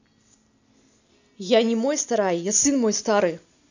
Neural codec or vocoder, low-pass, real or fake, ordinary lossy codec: none; 7.2 kHz; real; none